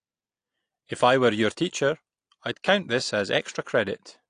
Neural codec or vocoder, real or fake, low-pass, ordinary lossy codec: none; real; 9.9 kHz; AAC, 48 kbps